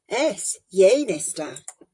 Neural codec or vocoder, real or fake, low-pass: vocoder, 44.1 kHz, 128 mel bands, Pupu-Vocoder; fake; 10.8 kHz